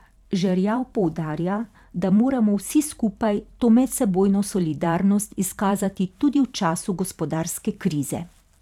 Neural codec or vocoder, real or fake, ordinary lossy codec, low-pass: vocoder, 44.1 kHz, 128 mel bands every 512 samples, BigVGAN v2; fake; none; 19.8 kHz